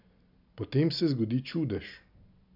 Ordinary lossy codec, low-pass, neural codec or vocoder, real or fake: none; 5.4 kHz; none; real